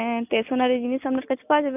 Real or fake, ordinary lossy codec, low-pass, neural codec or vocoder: real; none; 3.6 kHz; none